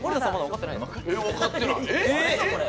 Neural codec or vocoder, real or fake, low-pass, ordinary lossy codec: none; real; none; none